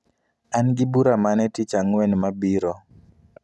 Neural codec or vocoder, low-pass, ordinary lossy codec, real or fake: none; none; none; real